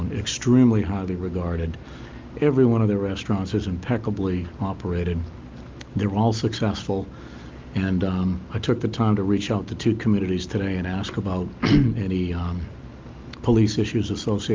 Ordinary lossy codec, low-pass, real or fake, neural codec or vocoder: Opus, 32 kbps; 7.2 kHz; real; none